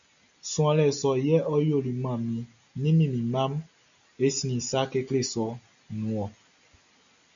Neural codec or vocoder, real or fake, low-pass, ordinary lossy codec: none; real; 7.2 kHz; MP3, 96 kbps